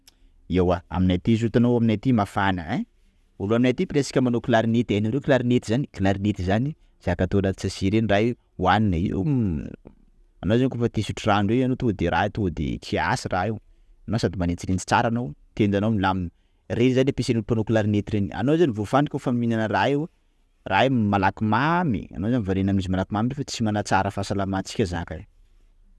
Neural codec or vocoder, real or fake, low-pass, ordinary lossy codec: none; real; none; none